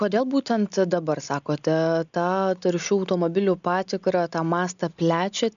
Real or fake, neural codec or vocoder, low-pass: real; none; 7.2 kHz